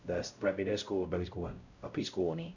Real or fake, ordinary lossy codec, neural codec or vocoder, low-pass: fake; none; codec, 16 kHz, 0.5 kbps, X-Codec, WavLM features, trained on Multilingual LibriSpeech; 7.2 kHz